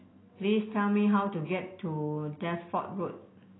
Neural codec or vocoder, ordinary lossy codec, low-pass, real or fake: none; AAC, 16 kbps; 7.2 kHz; real